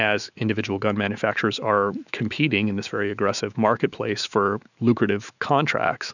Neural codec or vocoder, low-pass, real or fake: autoencoder, 48 kHz, 128 numbers a frame, DAC-VAE, trained on Japanese speech; 7.2 kHz; fake